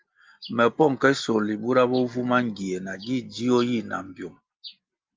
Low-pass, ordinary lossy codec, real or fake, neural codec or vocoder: 7.2 kHz; Opus, 24 kbps; real; none